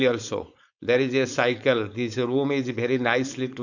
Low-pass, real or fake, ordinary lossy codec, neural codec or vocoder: 7.2 kHz; fake; none; codec, 16 kHz, 4.8 kbps, FACodec